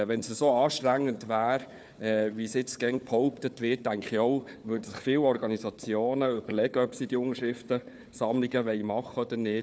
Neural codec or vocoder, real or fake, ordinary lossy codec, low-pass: codec, 16 kHz, 4 kbps, FunCodec, trained on Chinese and English, 50 frames a second; fake; none; none